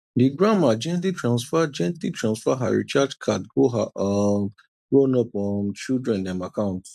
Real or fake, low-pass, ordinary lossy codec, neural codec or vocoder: real; 14.4 kHz; none; none